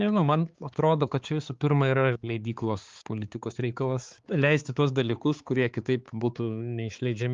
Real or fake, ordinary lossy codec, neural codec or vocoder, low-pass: fake; Opus, 32 kbps; codec, 16 kHz, 4 kbps, X-Codec, HuBERT features, trained on balanced general audio; 7.2 kHz